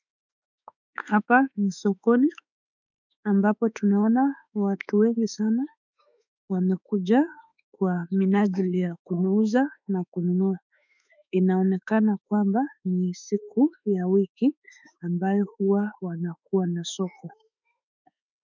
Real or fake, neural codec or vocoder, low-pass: fake; autoencoder, 48 kHz, 32 numbers a frame, DAC-VAE, trained on Japanese speech; 7.2 kHz